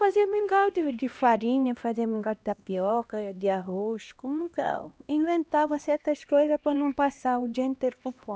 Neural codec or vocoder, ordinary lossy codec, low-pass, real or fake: codec, 16 kHz, 1 kbps, X-Codec, HuBERT features, trained on LibriSpeech; none; none; fake